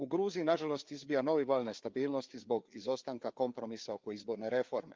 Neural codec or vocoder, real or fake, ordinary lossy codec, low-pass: codec, 16 kHz, 4 kbps, FreqCodec, larger model; fake; Opus, 24 kbps; 7.2 kHz